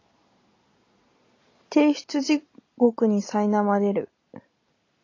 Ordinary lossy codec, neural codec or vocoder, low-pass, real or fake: AAC, 32 kbps; none; 7.2 kHz; real